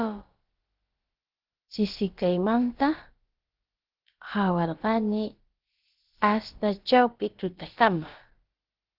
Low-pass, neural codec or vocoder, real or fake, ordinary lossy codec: 5.4 kHz; codec, 16 kHz, about 1 kbps, DyCAST, with the encoder's durations; fake; Opus, 16 kbps